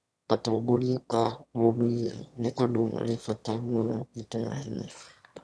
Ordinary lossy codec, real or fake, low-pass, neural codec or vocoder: none; fake; none; autoencoder, 22.05 kHz, a latent of 192 numbers a frame, VITS, trained on one speaker